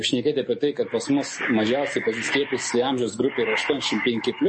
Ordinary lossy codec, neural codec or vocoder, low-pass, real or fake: MP3, 32 kbps; codec, 44.1 kHz, 7.8 kbps, DAC; 10.8 kHz; fake